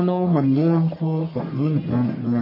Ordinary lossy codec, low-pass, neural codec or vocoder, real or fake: MP3, 24 kbps; 5.4 kHz; codec, 44.1 kHz, 1.7 kbps, Pupu-Codec; fake